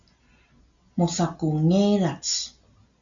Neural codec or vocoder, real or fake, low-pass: none; real; 7.2 kHz